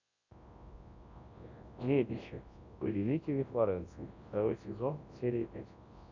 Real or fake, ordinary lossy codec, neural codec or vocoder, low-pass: fake; Opus, 64 kbps; codec, 24 kHz, 0.9 kbps, WavTokenizer, large speech release; 7.2 kHz